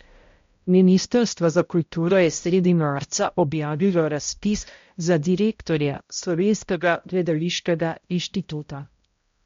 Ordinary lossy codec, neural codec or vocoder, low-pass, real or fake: MP3, 48 kbps; codec, 16 kHz, 0.5 kbps, X-Codec, HuBERT features, trained on balanced general audio; 7.2 kHz; fake